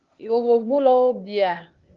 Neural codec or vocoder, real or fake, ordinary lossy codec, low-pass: codec, 16 kHz, 0.8 kbps, ZipCodec; fake; Opus, 32 kbps; 7.2 kHz